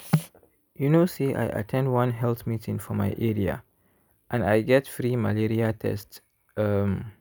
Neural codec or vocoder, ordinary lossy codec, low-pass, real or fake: none; none; none; real